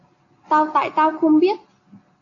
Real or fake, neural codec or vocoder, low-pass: real; none; 7.2 kHz